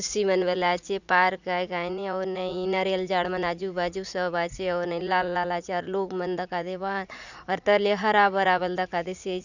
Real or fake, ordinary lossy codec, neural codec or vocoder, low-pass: fake; none; vocoder, 44.1 kHz, 80 mel bands, Vocos; 7.2 kHz